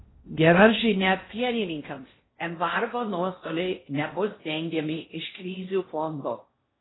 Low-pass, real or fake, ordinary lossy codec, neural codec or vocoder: 7.2 kHz; fake; AAC, 16 kbps; codec, 16 kHz in and 24 kHz out, 0.8 kbps, FocalCodec, streaming, 65536 codes